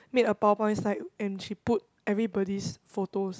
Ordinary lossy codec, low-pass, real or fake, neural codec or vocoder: none; none; real; none